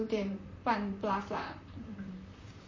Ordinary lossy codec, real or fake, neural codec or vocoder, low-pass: MP3, 32 kbps; fake; vocoder, 44.1 kHz, 128 mel bands, Pupu-Vocoder; 7.2 kHz